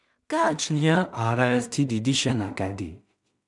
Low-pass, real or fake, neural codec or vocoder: 10.8 kHz; fake; codec, 16 kHz in and 24 kHz out, 0.4 kbps, LongCat-Audio-Codec, two codebook decoder